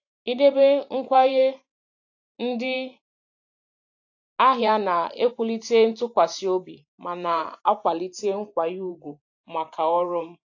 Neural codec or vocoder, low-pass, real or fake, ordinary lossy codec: vocoder, 44.1 kHz, 128 mel bands every 512 samples, BigVGAN v2; 7.2 kHz; fake; AAC, 48 kbps